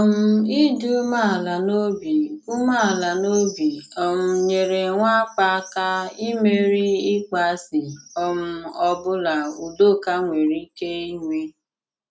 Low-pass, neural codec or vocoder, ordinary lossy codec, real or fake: none; none; none; real